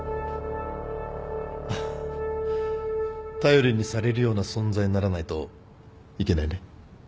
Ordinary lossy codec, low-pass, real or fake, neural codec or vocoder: none; none; real; none